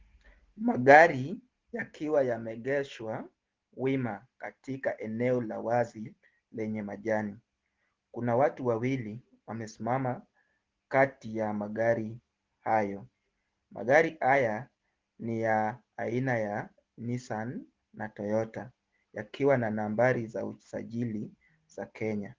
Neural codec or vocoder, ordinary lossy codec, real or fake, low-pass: none; Opus, 16 kbps; real; 7.2 kHz